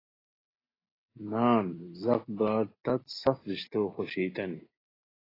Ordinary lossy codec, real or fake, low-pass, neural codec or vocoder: AAC, 24 kbps; real; 5.4 kHz; none